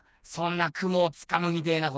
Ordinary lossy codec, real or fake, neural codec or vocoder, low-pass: none; fake; codec, 16 kHz, 2 kbps, FreqCodec, smaller model; none